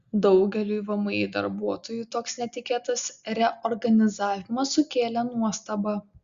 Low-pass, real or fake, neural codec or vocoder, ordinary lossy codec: 7.2 kHz; real; none; Opus, 64 kbps